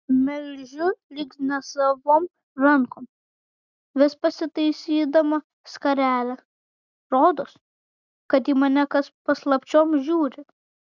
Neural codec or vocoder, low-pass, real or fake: none; 7.2 kHz; real